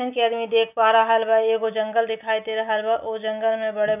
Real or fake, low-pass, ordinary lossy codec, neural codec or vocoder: real; 3.6 kHz; none; none